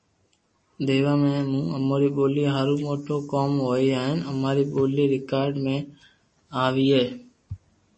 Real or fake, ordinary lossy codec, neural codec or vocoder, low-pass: real; MP3, 32 kbps; none; 10.8 kHz